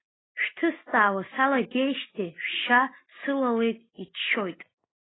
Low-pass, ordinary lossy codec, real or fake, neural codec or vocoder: 7.2 kHz; AAC, 16 kbps; real; none